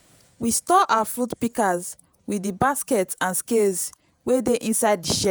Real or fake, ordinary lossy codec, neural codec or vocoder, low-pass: fake; none; vocoder, 48 kHz, 128 mel bands, Vocos; none